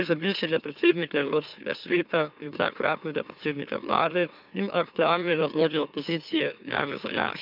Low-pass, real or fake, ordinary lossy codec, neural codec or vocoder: 5.4 kHz; fake; none; autoencoder, 44.1 kHz, a latent of 192 numbers a frame, MeloTTS